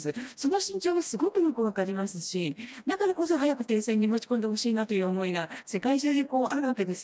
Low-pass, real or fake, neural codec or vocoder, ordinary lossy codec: none; fake; codec, 16 kHz, 1 kbps, FreqCodec, smaller model; none